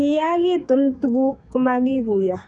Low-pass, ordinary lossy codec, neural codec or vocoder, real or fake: 10.8 kHz; none; codec, 32 kHz, 1.9 kbps, SNAC; fake